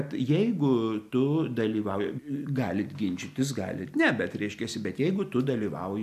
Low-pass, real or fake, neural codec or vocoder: 14.4 kHz; real; none